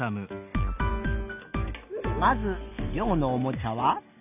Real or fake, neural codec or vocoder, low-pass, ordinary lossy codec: real; none; 3.6 kHz; none